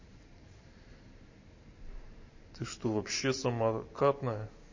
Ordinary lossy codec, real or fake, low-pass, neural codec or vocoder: MP3, 32 kbps; fake; 7.2 kHz; vocoder, 44.1 kHz, 128 mel bands every 512 samples, BigVGAN v2